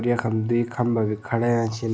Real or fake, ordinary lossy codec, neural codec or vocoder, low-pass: real; none; none; none